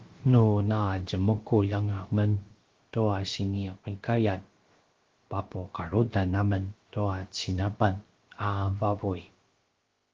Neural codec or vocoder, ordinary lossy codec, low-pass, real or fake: codec, 16 kHz, about 1 kbps, DyCAST, with the encoder's durations; Opus, 16 kbps; 7.2 kHz; fake